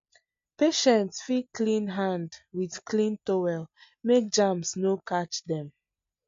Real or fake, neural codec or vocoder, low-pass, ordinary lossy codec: real; none; 7.2 kHz; MP3, 48 kbps